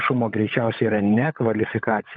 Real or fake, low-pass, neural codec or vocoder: fake; 7.2 kHz; codec, 16 kHz, 16 kbps, FunCodec, trained on LibriTTS, 50 frames a second